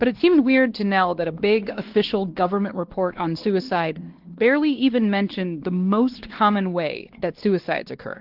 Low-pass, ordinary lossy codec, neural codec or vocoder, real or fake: 5.4 kHz; Opus, 16 kbps; codec, 16 kHz, 2 kbps, X-Codec, WavLM features, trained on Multilingual LibriSpeech; fake